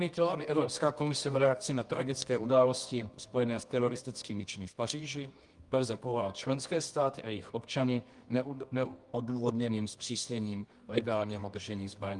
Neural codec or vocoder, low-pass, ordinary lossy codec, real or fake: codec, 24 kHz, 0.9 kbps, WavTokenizer, medium music audio release; 10.8 kHz; Opus, 32 kbps; fake